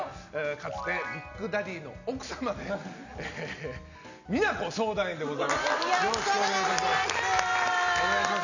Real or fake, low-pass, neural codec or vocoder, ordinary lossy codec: real; 7.2 kHz; none; none